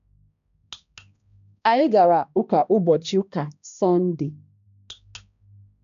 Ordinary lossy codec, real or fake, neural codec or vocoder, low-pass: none; fake; codec, 16 kHz, 1 kbps, X-Codec, HuBERT features, trained on balanced general audio; 7.2 kHz